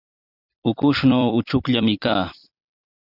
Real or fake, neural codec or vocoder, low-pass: fake; vocoder, 44.1 kHz, 128 mel bands every 256 samples, BigVGAN v2; 5.4 kHz